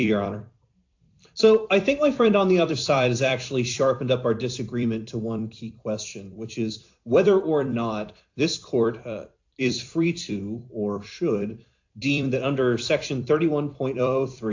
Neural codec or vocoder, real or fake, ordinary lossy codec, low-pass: vocoder, 44.1 kHz, 128 mel bands every 256 samples, BigVGAN v2; fake; AAC, 48 kbps; 7.2 kHz